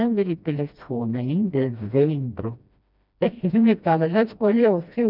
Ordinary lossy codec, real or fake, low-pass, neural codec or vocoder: none; fake; 5.4 kHz; codec, 16 kHz, 1 kbps, FreqCodec, smaller model